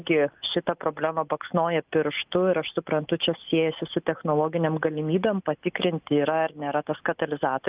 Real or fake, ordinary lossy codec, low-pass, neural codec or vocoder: real; Opus, 24 kbps; 3.6 kHz; none